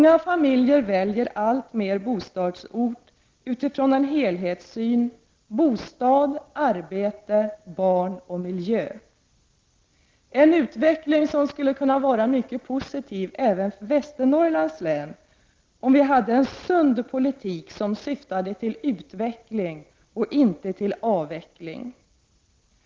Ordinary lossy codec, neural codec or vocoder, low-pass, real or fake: Opus, 16 kbps; none; 7.2 kHz; real